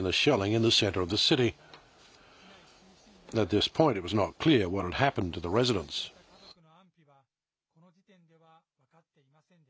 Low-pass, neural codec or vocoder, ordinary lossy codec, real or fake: none; none; none; real